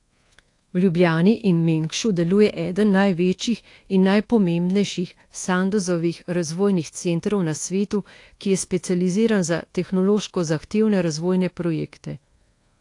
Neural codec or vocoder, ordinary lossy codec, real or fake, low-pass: codec, 24 kHz, 1.2 kbps, DualCodec; AAC, 48 kbps; fake; 10.8 kHz